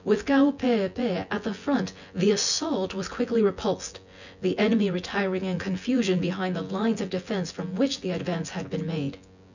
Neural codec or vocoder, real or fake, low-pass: vocoder, 24 kHz, 100 mel bands, Vocos; fake; 7.2 kHz